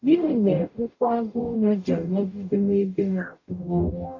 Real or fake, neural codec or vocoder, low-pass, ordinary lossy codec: fake; codec, 44.1 kHz, 0.9 kbps, DAC; 7.2 kHz; AAC, 48 kbps